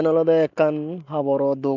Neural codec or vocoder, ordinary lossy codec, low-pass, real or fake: none; none; 7.2 kHz; real